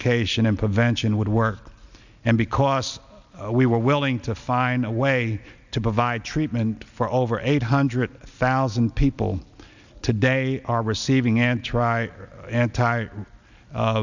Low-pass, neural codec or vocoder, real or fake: 7.2 kHz; none; real